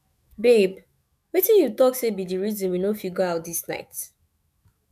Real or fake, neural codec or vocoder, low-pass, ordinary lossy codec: fake; autoencoder, 48 kHz, 128 numbers a frame, DAC-VAE, trained on Japanese speech; 14.4 kHz; none